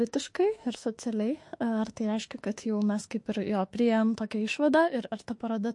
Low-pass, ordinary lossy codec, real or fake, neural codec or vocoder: 10.8 kHz; MP3, 48 kbps; fake; autoencoder, 48 kHz, 32 numbers a frame, DAC-VAE, trained on Japanese speech